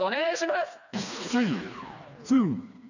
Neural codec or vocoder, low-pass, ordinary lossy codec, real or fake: codec, 16 kHz, 2 kbps, FreqCodec, smaller model; 7.2 kHz; none; fake